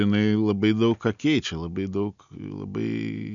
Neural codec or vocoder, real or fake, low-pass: none; real; 7.2 kHz